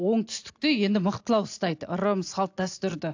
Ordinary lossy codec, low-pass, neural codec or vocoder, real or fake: AAC, 48 kbps; 7.2 kHz; none; real